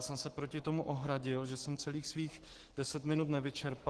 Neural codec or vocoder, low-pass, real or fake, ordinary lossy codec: codec, 44.1 kHz, 7.8 kbps, Pupu-Codec; 10.8 kHz; fake; Opus, 16 kbps